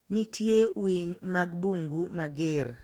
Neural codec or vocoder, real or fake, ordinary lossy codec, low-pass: codec, 44.1 kHz, 2.6 kbps, DAC; fake; none; 19.8 kHz